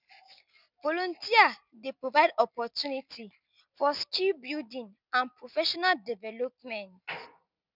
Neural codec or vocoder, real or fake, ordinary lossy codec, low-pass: none; real; none; 5.4 kHz